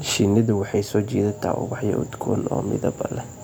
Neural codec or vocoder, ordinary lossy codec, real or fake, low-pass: none; none; real; none